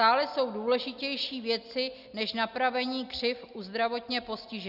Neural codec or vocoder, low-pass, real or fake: none; 5.4 kHz; real